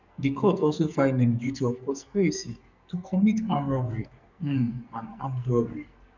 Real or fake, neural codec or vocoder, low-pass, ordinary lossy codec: fake; codec, 44.1 kHz, 2.6 kbps, SNAC; 7.2 kHz; none